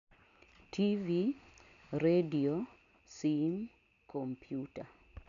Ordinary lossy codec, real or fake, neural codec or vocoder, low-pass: none; real; none; 7.2 kHz